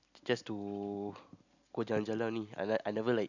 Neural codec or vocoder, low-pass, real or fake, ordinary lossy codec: none; 7.2 kHz; real; none